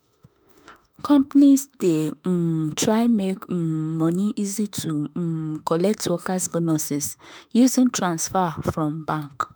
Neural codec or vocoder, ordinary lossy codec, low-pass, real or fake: autoencoder, 48 kHz, 32 numbers a frame, DAC-VAE, trained on Japanese speech; none; none; fake